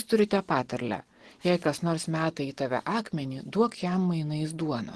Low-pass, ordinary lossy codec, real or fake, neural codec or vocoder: 10.8 kHz; Opus, 16 kbps; real; none